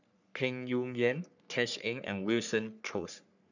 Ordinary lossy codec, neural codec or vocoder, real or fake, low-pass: none; codec, 44.1 kHz, 3.4 kbps, Pupu-Codec; fake; 7.2 kHz